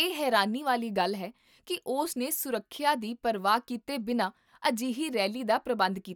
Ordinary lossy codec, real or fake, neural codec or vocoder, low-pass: none; real; none; 19.8 kHz